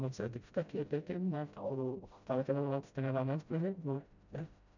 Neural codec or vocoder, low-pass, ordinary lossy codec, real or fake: codec, 16 kHz, 0.5 kbps, FreqCodec, smaller model; 7.2 kHz; none; fake